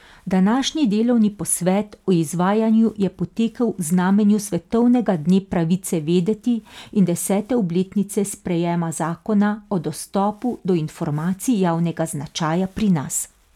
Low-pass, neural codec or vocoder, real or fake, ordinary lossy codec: 19.8 kHz; none; real; none